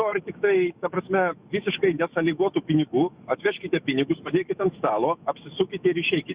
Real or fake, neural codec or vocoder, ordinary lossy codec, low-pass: fake; autoencoder, 48 kHz, 128 numbers a frame, DAC-VAE, trained on Japanese speech; Opus, 64 kbps; 3.6 kHz